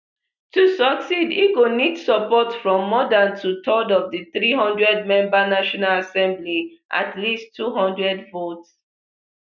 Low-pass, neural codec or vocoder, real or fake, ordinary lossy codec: 7.2 kHz; none; real; none